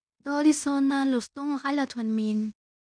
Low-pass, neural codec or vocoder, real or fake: 9.9 kHz; codec, 16 kHz in and 24 kHz out, 0.9 kbps, LongCat-Audio-Codec, fine tuned four codebook decoder; fake